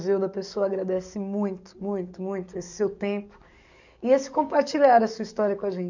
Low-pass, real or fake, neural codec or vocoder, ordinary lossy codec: 7.2 kHz; fake; codec, 44.1 kHz, 7.8 kbps, DAC; none